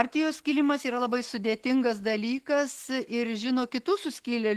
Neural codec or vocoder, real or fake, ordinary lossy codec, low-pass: autoencoder, 48 kHz, 128 numbers a frame, DAC-VAE, trained on Japanese speech; fake; Opus, 16 kbps; 14.4 kHz